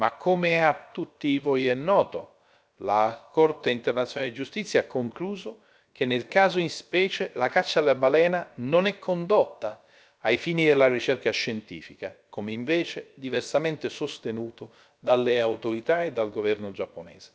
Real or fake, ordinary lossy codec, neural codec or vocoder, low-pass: fake; none; codec, 16 kHz, about 1 kbps, DyCAST, with the encoder's durations; none